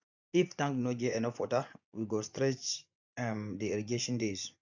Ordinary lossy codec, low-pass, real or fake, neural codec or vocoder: none; 7.2 kHz; fake; vocoder, 24 kHz, 100 mel bands, Vocos